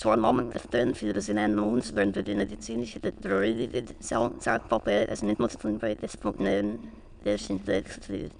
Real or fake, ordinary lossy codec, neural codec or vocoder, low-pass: fake; none; autoencoder, 22.05 kHz, a latent of 192 numbers a frame, VITS, trained on many speakers; 9.9 kHz